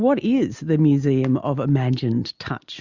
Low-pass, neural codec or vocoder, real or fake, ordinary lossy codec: 7.2 kHz; none; real; Opus, 64 kbps